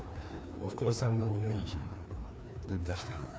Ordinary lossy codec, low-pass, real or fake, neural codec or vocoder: none; none; fake; codec, 16 kHz, 2 kbps, FreqCodec, larger model